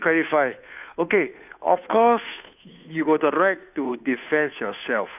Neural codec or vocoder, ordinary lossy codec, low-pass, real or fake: codec, 16 kHz, 2 kbps, FunCodec, trained on Chinese and English, 25 frames a second; none; 3.6 kHz; fake